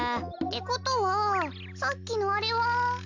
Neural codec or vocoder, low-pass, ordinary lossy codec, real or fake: none; 7.2 kHz; MP3, 64 kbps; real